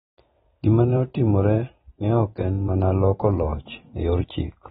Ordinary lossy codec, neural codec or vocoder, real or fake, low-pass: AAC, 16 kbps; vocoder, 44.1 kHz, 128 mel bands every 256 samples, BigVGAN v2; fake; 19.8 kHz